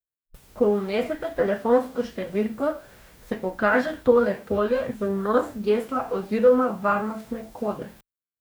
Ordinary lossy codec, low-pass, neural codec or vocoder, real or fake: none; none; codec, 44.1 kHz, 2.6 kbps, DAC; fake